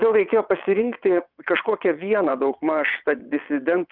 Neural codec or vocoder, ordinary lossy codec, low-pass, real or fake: vocoder, 22.05 kHz, 80 mel bands, WaveNeXt; Opus, 64 kbps; 5.4 kHz; fake